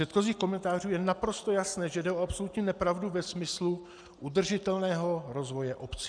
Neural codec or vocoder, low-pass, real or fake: none; 9.9 kHz; real